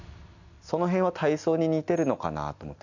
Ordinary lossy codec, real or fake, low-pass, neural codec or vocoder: none; real; 7.2 kHz; none